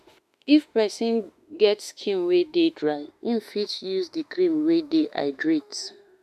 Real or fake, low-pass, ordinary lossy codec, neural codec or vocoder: fake; 14.4 kHz; none; autoencoder, 48 kHz, 32 numbers a frame, DAC-VAE, trained on Japanese speech